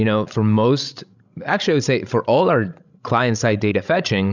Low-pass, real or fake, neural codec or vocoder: 7.2 kHz; fake; codec, 16 kHz, 16 kbps, FreqCodec, larger model